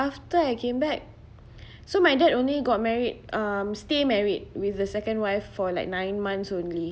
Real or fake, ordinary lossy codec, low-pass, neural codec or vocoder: real; none; none; none